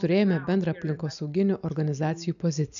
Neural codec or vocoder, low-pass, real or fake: none; 7.2 kHz; real